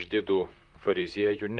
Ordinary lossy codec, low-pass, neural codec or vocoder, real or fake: Opus, 32 kbps; 10.8 kHz; vocoder, 44.1 kHz, 128 mel bands, Pupu-Vocoder; fake